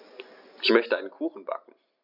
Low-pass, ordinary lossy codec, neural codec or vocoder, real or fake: 5.4 kHz; none; none; real